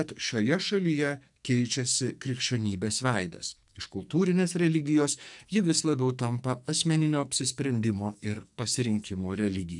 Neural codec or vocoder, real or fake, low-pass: codec, 44.1 kHz, 2.6 kbps, SNAC; fake; 10.8 kHz